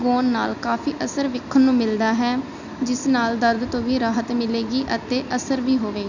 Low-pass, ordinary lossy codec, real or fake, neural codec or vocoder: 7.2 kHz; none; real; none